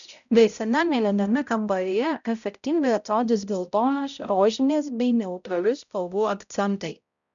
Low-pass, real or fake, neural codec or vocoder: 7.2 kHz; fake; codec, 16 kHz, 0.5 kbps, X-Codec, HuBERT features, trained on balanced general audio